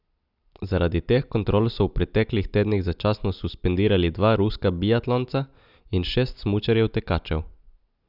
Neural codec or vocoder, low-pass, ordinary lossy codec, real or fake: none; 5.4 kHz; none; real